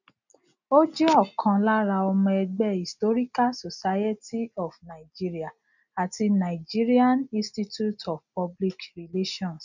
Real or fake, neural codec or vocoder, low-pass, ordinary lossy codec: real; none; 7.2 kHz; none